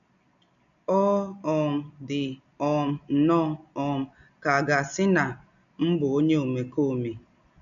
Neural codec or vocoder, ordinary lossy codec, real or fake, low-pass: none; none; real; 7.2 kHz